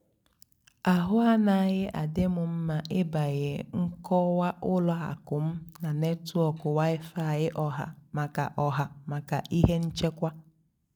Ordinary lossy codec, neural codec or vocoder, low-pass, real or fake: none; none; 19.8 kHz; real